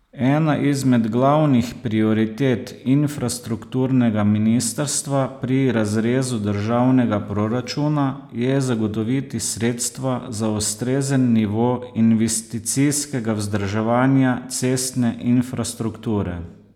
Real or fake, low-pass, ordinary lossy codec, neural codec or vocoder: real; 19.8 kHz; none; none